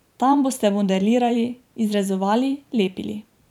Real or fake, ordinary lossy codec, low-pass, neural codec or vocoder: fake; none; 19.8 kHz; vocoder, 44.1 kHz, 128 mel bands every 512 samples, BigVGAN v2